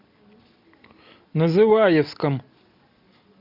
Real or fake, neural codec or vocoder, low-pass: real; none; 5.4 kHz